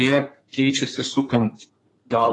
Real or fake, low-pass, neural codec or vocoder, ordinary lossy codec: fake; 10.8 kHz; codec, 32 kHz, 1.9 kbps, SNAC; AAC, 32 kbps